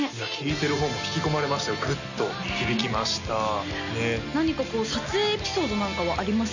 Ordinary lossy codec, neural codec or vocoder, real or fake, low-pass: none; none; real; 7.2 kHz